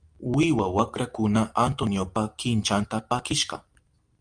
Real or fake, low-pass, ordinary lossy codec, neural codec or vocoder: real; 9.9 kHz; Opus, 32 kbps; none